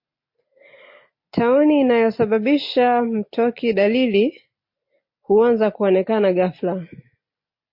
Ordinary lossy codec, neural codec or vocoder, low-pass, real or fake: MP3, 32 kbps; none; 5.4 kHz; real